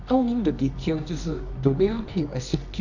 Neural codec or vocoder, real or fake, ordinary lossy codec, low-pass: codec, 24 kHz, 0.9 kbps, WavTokenizer, medium music audio release; fake; none; 7.2 kHz